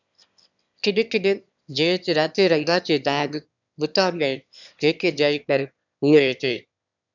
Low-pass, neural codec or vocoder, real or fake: 7.2 kHz; autoencoder, 22.05 kHz, a latent of 192 numbers a frame, VITS, trained on one speaker; fake